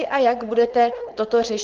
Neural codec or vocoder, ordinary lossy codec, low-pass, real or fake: codec, 16 kHz, 4.8 kbps, FACodec; Opus, 16 kbps; 7.2 kHz; fake